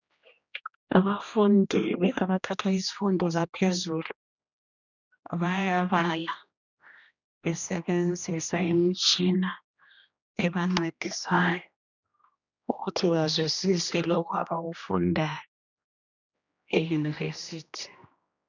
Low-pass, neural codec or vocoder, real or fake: 7.2 kHz; codec, 16 kHz, 1 kbps, X-Codec, HuBERT features, trained on general audio; fake